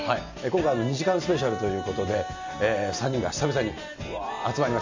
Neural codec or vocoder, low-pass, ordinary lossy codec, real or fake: none; 7.2 kHz; AAC, 48 kbps; real